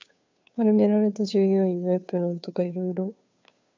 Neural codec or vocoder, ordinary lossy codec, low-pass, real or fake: codec, 16 kHz, 2 kbps, FunCodec, trained on Chinese and English, 25 frames a second; MP3, 64 kbps; 7.2 kHz; fake